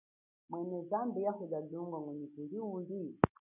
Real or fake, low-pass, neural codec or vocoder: real; 3.6 kHz; none